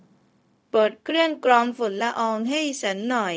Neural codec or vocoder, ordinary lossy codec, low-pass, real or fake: codec, 16 kHz, 0.4 kbps, LongCat-Audio-Codec; none; none; fake